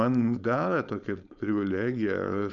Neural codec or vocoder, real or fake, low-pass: codec, 16 kHz, 4.8 kbps, FACodec; fake; 7.2 kHz